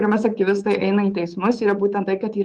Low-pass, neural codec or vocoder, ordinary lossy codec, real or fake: 10.8 kHz; codec, 24 kHz, 3.1 kbps, DualCodec; Opus, 24 kbps; fake